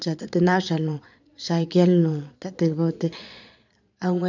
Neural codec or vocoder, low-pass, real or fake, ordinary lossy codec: vocoder, 22.05 kHz, 80 mel bands, Vocos; 7.2 kHz; fake; none